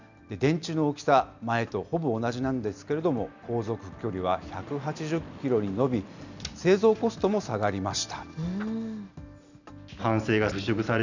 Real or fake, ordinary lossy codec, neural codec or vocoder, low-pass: real; none; none; 7.2 kHz